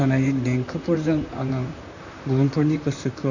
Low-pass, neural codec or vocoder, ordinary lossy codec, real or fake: 7.2 kHz; vocoder, 44.1 kHz, 128 mel bands, Pupu-Vocoder; none; fake